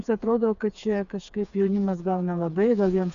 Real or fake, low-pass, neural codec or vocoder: fake; 7.2 kHz; codec, 16 kHz, 4 kbps, FreqCodec, smaller model